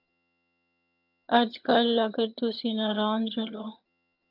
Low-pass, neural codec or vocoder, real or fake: 5.4 kHz; vocoder, 22.05 kHz, 80 mel bands, HiFi-GAN; fake